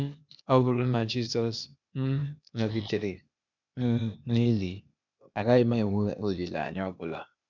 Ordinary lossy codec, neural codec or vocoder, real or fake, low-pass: none; codec, 16 kHz, 0.8 kbps, ZipCodec; fake; 7.2 kHz